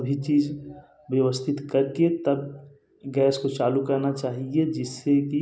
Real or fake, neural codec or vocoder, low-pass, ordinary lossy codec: real; none; none; none